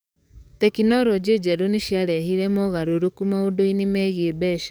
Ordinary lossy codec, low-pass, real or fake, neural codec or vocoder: none; none; fake; codec, 44.1 kHz, 7.8 kbps, DAC